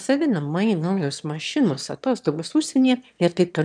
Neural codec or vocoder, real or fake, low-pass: autoencoder, 22.05 kHz, a latent of 192 numbers a frame, VITS, trained on one speaker; fake; 9.9 kHz